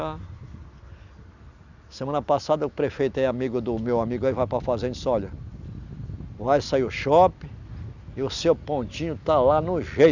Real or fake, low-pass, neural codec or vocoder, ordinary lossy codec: real; 7.2 kHz; none; none